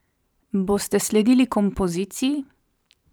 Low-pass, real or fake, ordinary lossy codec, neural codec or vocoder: none; fake; none; vocoder, 44.1 kHz, 128 mel bands every 512 samples, BigVGAN v2